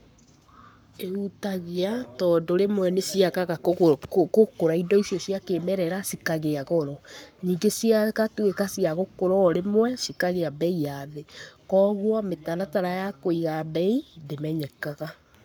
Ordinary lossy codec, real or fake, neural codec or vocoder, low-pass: none; fake; codec, 44.1 kHz, 7.8 kbps, Pupu-Codec; none